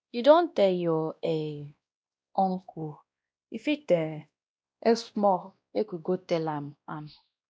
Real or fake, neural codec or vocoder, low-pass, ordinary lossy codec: fake; codec, 16 kHz, 1 kbps, X-Codec, WavLM features, trained on Multilingual LibriSpeech; none; none